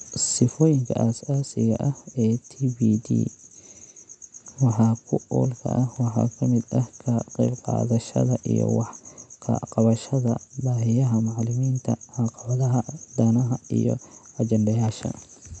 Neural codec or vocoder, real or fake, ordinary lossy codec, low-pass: none; real; none; 9.9 kHz